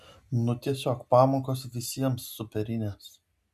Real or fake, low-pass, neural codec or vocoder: real; 14.4 kHz; none